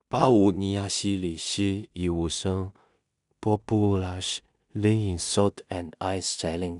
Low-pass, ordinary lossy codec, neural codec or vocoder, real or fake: 10.8 kHz; none; codec, 16 kHz in and 24 kHz out, 0.4 kbps, LongCat-Audio-Codec, two codebook decoder; fake